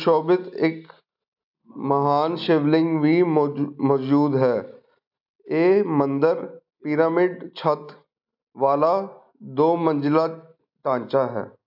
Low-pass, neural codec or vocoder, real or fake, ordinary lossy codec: 5.4 kHz; none; real; AAC, 48 kbps